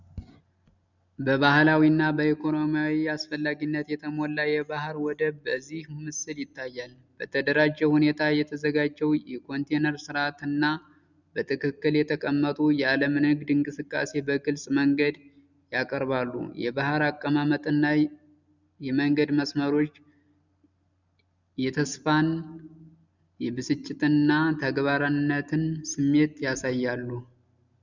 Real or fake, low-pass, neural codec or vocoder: real; 7.2 kHz; none